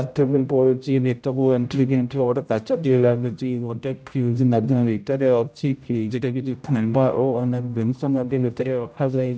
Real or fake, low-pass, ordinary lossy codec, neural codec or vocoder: fake; none; none; codec, 16 kHz, 0.5 kbps, X-Codec, HuBERT features, trained on general audio